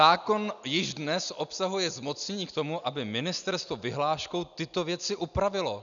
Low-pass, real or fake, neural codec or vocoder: 7.2 kHz; real; none